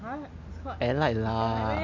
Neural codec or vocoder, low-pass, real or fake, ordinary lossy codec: none; 7.2 kHz; real; AAC, 48 kbps